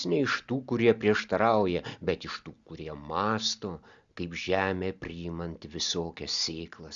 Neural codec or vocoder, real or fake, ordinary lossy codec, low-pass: none; real; Opus, 64 kbps; 7.2 kHz